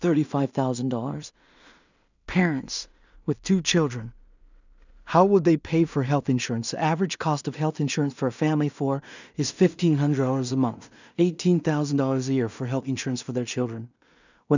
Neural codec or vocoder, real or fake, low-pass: codec, 16 kHz in and 24 kHz out, 0.4 kbps, LongCat-Audio-Codec, two codebook decoder; fake; 7.2 kHz